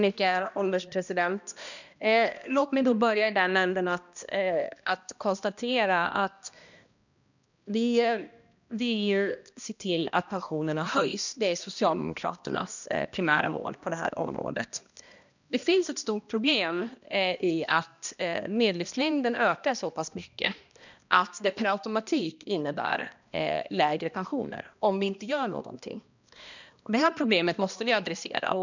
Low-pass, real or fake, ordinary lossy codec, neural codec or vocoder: 7.2 kHz; fake; none; codec, 16 kHz, 1 kbps, X-Codec, HuBERT features, trained on balanced general audio